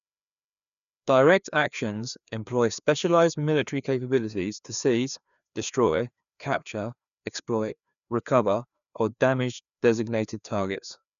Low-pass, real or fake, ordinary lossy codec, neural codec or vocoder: 7.2 kHz; fake; none; codec, 16 kHz, 2 kbps, FreqCodec, larger model